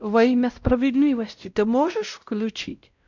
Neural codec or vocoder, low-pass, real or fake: codec, 16 kHz, 0.5 kbps, X-Codec, WavLM features, trained on Multilingual LibriSpeech; 7.2 kHz; fake